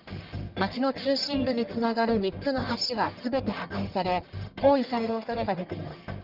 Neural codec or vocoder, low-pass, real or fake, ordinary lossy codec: codec, 44.1 kHz, 1.7 kbps, Pupu-Codec; 5.4 kHz; fake; Opus, 32 kbps